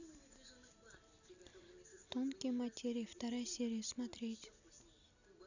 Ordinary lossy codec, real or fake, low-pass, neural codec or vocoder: none; real; 7.2 kHz; none